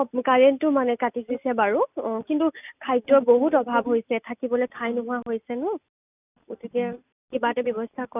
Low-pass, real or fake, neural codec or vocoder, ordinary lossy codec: 3.6 kHz; real; none; none